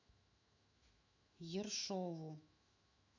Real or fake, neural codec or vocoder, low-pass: fake; autoencoder, 48 kHz, 128 numbers a frame, DAC-VAE, trained on Japanese speech; 7.2 kHz